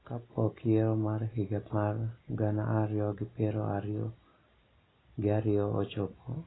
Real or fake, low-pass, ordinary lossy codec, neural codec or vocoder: real; 7.2 kHz; AAC, 16 kbps; none